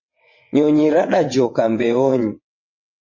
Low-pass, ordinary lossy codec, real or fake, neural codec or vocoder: 7.2 kHz; MP3, 32 kbps; fake; vocoder, 22.05 kHz, 80 mel bands, WaveNeXt